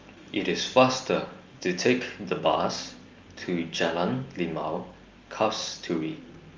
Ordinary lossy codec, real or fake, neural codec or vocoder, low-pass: Opus, 32 kbps; real; none; 7.2 kHz